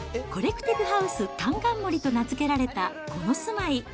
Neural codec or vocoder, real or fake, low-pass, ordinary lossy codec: none; real; none; none